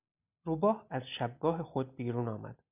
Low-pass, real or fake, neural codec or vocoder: 3.6 kHz; real; none